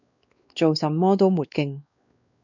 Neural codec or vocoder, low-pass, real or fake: codec, 16 kHz, 2 kbps, X-Codec, WavLM features, trained on Multilingual LibriSpeech; 7.2 kHz; fake